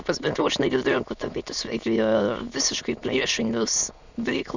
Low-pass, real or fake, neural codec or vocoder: 7.2 kHz; fake; autoencoder, 22.05 kHz, a latent of 192 numbers a frame, VITS, trained on many speakers